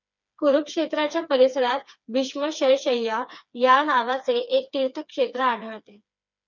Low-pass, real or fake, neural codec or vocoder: 7.2 kHz; fake; codec, 16 kHz, 4 kbps, FreqCodec, smaller model